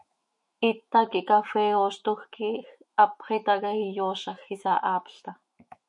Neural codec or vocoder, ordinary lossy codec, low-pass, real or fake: autoencoder, 48 kHz, 128 numbers a frame, DAC-VAE, trained on Japanese speech; MP3, 48 kbps; 10.8 kHz; fake